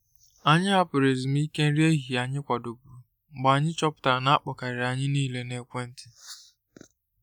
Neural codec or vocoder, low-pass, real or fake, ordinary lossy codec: none; 19.8 kHz; real; none